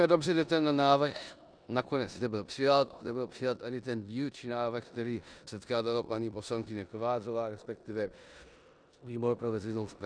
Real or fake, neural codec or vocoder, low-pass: fake; codec, 16 kHz in and 24 kHz out, 0.9 kbps, LongCat-Audio-Codec, four codebook decoder; 9.9 kHz